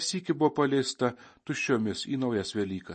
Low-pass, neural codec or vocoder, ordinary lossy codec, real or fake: 10.8 kHz; none; MP3, 32 kbps; real